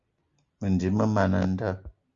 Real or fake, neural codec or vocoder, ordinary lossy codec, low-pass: real; none; Opus, 32 kbps; 7.2 kHz